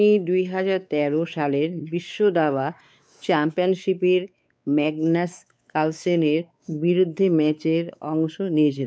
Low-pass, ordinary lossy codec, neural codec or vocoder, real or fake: none; none; codec, 16 kHz, 4 kbps, X-Codec, WavLM features, trained on Multilingual LibriSpeech; fake